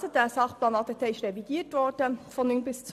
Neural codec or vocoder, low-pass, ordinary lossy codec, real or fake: none; 14.4 kHz; none; real